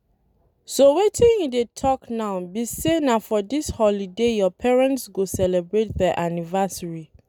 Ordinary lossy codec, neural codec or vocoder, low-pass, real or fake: none; none; 19.8 kHz; real